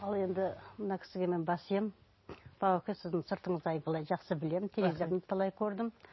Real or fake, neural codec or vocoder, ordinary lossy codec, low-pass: real; none; MP3, 24 kbps; 7.2 kHz